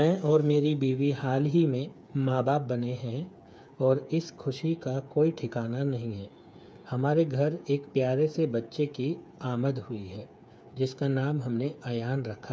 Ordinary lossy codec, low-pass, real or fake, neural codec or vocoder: none; none; fake; codec, 16 kHz, 8 kbps, FreqCodec, smaller model